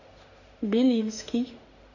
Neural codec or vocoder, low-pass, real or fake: autoencoder, 48 kHz, 32 numbers a frame, DAC-VAE, trained on Japanese speech; 7.2 kHz; fake